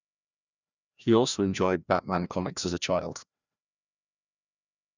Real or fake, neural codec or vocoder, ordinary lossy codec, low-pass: fake; codec, 16 kHz, 1 kbps, FreqCodec, larger model; none; 7.2 kHz